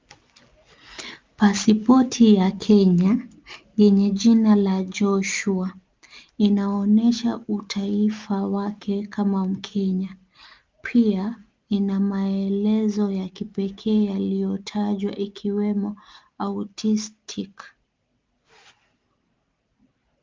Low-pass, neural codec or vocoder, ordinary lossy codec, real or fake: 7.2 kHz; none; Opus, 24 kbps; real